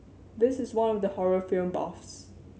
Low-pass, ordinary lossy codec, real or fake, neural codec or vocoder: none; none; real; none